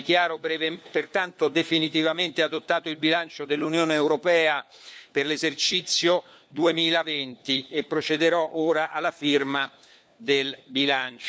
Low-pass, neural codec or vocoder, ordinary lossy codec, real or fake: none; codec, 16 kHz, 4 kbps, FunCodec, trained on LibriTTS, 50 frames a second; none; fake